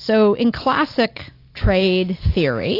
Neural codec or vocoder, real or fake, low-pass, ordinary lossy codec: none; real; 5.4 kHz; AAC, 32 kbps